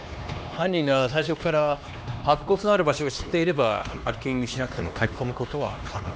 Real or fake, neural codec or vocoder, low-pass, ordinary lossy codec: fake; codec, 16 kHz, 2 kbps, X-Codec, HuBERT features, trained on LibriSpeech; none; none